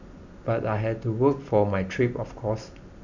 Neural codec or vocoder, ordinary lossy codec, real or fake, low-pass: none; none; real; 7.2 kHz